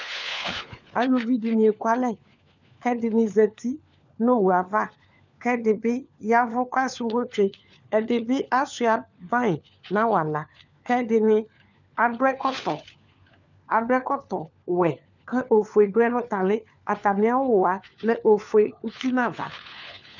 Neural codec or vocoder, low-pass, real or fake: codec, 16 kHz, 4 kbps, FunCodec, trained on LibriTTS, 50 frames a second; 7.2 kHz; fake